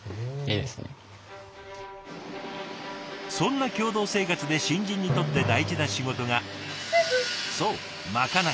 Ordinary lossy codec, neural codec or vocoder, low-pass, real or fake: none; none; none; real